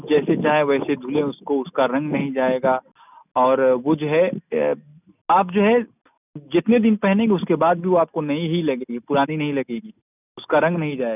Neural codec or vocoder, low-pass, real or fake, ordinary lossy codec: none; 3.6 kHz; real; none